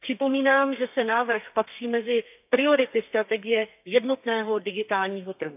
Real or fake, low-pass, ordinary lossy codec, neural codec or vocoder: fake; 3.6 kHz; AAC, 32 kbps; codec, 32 kHz, 1.9 kbps, SNAC